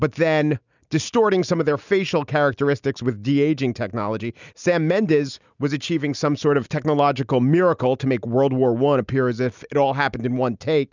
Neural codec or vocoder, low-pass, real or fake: none; 7.2 kHz; real